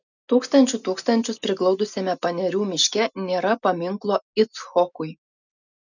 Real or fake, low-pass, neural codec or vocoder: real; 7.2 kHz; none